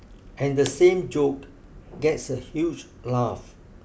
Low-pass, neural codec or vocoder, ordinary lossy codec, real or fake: none; none; none; real